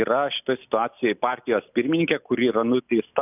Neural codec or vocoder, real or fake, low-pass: none; real; 3.6 kHz